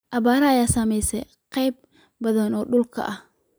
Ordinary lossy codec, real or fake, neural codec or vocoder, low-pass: none; real; none; none